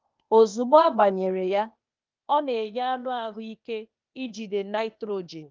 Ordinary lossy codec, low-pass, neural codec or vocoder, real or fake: Opus, 24 kbps; 7.2 kHz; codec, 16 kHz, 0.8 kbps, ZipCodec; fake